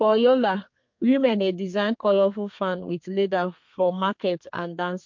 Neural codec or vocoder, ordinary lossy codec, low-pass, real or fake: codec, 32 kHz, 1.9 kbps, SNAC; MP3, 48 kbps; 7.2 kHz; fake